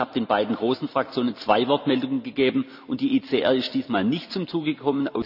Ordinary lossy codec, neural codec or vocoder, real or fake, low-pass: none; none; real; 5.4 kHz